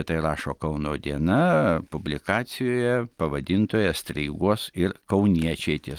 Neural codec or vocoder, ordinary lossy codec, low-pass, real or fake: none; Opus, 24 kbps; 19.8 kHz; real